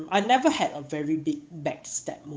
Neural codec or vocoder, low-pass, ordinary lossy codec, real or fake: codec, 16 kHz, 8 kbps, FunCodec, trained on Chinese and English, 25 frames a second; none; none; fake